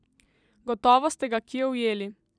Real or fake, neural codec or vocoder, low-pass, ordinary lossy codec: fake; vocoder, 44.1 kHz, 128 mel bands every 256 samples, BigVGAN v2; 9.9 kHz; none